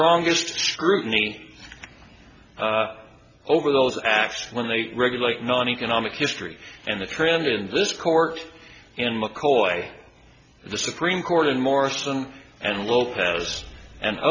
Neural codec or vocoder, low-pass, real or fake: none; 7.2 kHz; real